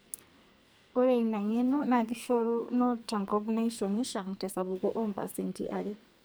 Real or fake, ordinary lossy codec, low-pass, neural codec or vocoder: fake; none; none; codec, 44.1 kHz, 2.6 kbps, SNAC